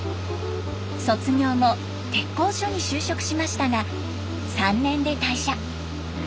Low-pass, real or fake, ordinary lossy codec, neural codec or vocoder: none; real; none; none